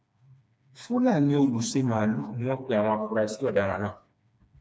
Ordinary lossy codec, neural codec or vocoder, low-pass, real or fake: none; codec, 16 kHz, 2 kbps, FreqCodec, smaller model; none; fake